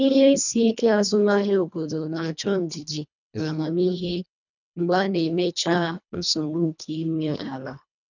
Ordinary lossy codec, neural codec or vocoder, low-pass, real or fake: none; codec, 24 kHz, 1.5 kbps, HILCodec; 7.2 kHz; fake